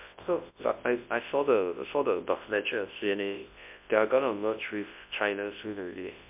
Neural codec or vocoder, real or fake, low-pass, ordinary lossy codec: codec, 24 kHz, 0.9 kbps, WavTokenizer, large speech release; fake; 3.6 kHz; MP3, 32 kbps